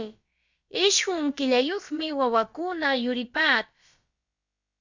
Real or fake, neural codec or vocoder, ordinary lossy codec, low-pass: fake; codec, 16 kHz, about 1 kbps, DyCAST, with the encoder's durations; Opus, 64 kbps; 7.2 kHz